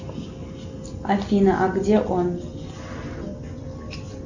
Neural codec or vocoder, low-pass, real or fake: none; 7.2 kHz; real